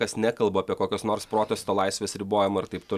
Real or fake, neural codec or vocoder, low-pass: real; none; 14.4 kHz